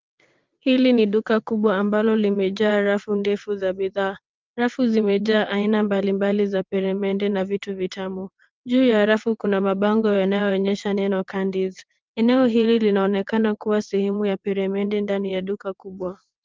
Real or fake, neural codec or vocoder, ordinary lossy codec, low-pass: fake; vocoder, 22.05 kHz, 80 mel bands, WaveNeXt; Opus, 32 kbps; 7.2 kHz